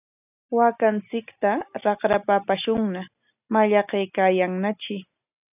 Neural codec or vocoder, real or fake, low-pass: none; real; 3.6 kHz